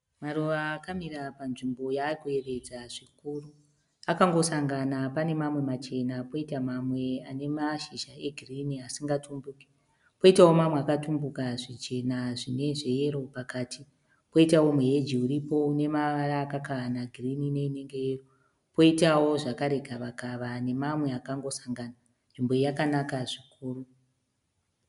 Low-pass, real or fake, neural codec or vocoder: 10.8 kHz; real; none